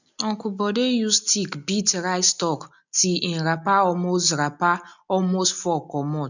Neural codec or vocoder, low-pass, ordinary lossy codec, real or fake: none; 7.2 kHz; none; real